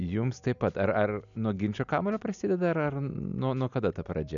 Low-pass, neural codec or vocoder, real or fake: 7.2 kHz; none; real